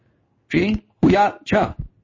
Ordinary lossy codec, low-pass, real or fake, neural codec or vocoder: MP3, 32 kbps; 7.2 kHz; fake; codec, 16 kHz in and 24 kHz out, 1 kbps, XY-Tokenizer